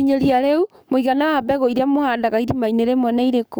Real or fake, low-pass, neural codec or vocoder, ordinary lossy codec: fake; none; codec, 44.1 kHz, 7.8 kbps, DAC; none